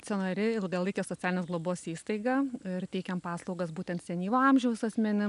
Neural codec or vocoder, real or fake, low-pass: none; real; 10.8 kHz